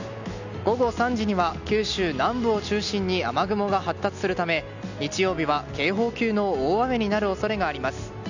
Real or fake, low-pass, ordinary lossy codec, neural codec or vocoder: real; 7.2 kHz; none; none